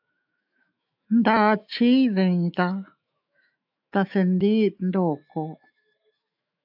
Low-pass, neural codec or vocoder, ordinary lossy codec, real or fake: 5.4 kHz; autoencoder, 48 kHz, 128 numbers a frame, DAC-VAE, trained on Japanese speech; AAC, 48 kbps; fake